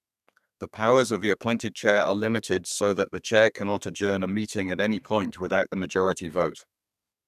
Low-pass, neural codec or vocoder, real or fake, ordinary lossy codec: 14.4 kHz; codec, 32 kHz, 1.9 kbps, SNAC; fake; none